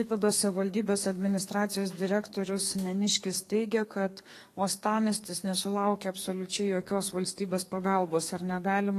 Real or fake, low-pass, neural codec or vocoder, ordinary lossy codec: fake; 14.4 kHz; codec, 32 kHz, 1.9 kbps, SNAC; AAC, 48 kbps